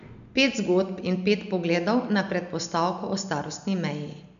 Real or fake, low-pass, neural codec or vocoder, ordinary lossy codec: real; 7.2 kHz; none; none